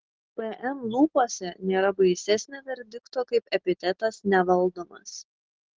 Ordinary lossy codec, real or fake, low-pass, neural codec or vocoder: Opus, 16 kbps; real; 7.2 kHz; none